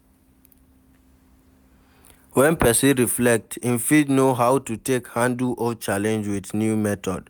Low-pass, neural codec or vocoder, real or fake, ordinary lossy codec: none; none; real; none